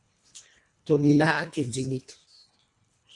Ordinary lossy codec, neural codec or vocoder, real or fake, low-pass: Opus, 64 kbps; codec, 24 kHz, 1.5 kbps, HILCodec; fake; 10.8 kHz